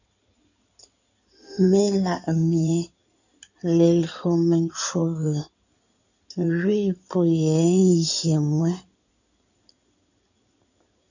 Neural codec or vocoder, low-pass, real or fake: codec, 16 kHz in and 24 kHz out, 2.2 kbps, FireRedTTS-2 codec; 7.2 kHz; fake